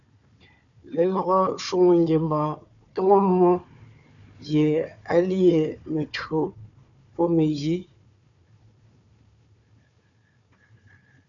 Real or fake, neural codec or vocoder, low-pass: fake; codec, 16 kHz, 4 kbps, FunCodec, trained on Chinese and English, 50 frames a second; 7.2 kHz